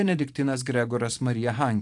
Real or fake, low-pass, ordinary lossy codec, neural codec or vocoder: fake; 10.8 kHz; AAC, 64 kbps; vocoder, 44.1 kHz, 128 mel bands every 512 samples, BigVGAN v2